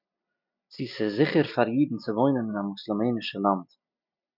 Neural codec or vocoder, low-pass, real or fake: none; 5.4 kHz; real